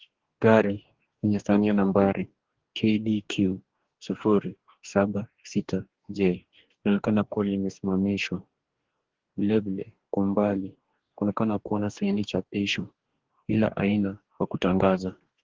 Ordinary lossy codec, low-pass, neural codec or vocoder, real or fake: Opus, 16 kbps; 7.2 kHz; codec, 44.1 kHz, 2.6 kbps, DAC; fake